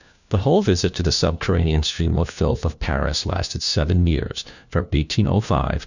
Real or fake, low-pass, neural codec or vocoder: fake; 7.2 kHz; codec, 16 kHz, 1 kbps, FunCodec, trained on LibriTTS, 50 frames a second